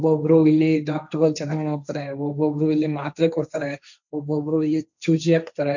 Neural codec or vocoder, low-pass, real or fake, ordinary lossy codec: codec, 16 kHz, 1.1 kbps, Voila-Tokenizer; 7.2 kHz; fake; none